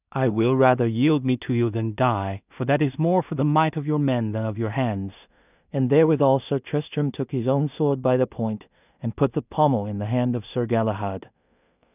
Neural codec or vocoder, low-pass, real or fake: codec, 16 kHz in and 24 kHz out, 0.4 kbps, LongCat-Audio-Codec, two codebook decoder; 3.6 kHz; fake